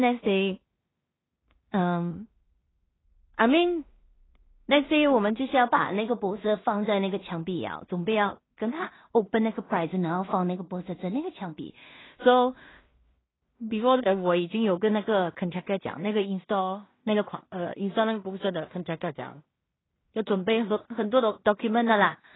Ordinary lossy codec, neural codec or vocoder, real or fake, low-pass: AAC, 16 kbps; codec, 16 kHz in and 24 kHz out, 0.4 kbps, LongCat-Audio-Codec, two codebook decoder; fake; 7.2 kHz